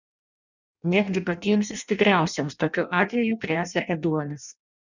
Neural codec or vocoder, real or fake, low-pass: codec, 16 kHz in and 24 kHz out, 0.6 kbps, FireRedTTS-2 codec; fake; 7.2 kHz